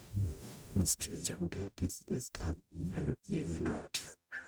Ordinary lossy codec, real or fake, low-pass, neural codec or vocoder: none; fake; none; codec, 44.1 kHz, 0.9 kbps, DAC